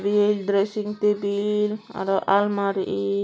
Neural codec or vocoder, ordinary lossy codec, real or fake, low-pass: none; none; real; none